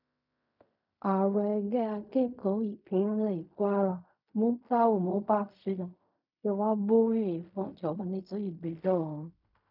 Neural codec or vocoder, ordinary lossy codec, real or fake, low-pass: codec, 16 kHz in and 24 kHz out, 0.4 kbps, LongCat-Audio-Codec, fine tuned four codebook decoder; none; fake; 5.4 kHz